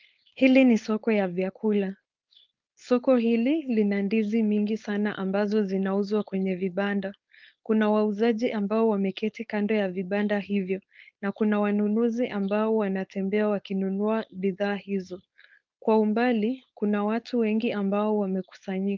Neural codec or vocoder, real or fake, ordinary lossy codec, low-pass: codec, 16 kHz, 4.8 kbps, FACodec; fake; Opus, 32 kbps; 7.2 kHz